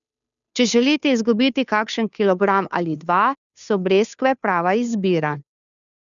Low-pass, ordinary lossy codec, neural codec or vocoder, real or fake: 7.2 kHz; none; codec, 16 kHz, 2 kbps, FunCodec, trained on Chinese and English, 25 frames a second; fake